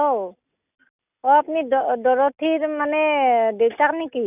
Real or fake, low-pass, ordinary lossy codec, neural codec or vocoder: real; 3.6 kHz; none; none